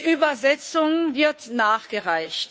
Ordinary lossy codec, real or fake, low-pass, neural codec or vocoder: none; fake; none; codec, 16 kHz, 2 kbps, FunCodec, trained on Chinese and English, 25 frames a second